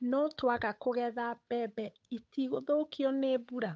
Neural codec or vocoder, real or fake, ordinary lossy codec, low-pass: codec, 16 kHz, 8 kbps, FunCodec, trained on Chinese and English, 25 frames a second; fake; none; none